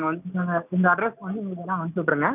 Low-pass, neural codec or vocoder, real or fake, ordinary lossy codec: 3.6 kHz; none; real; none